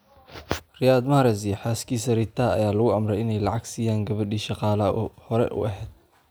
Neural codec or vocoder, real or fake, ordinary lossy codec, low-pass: none; real; none; none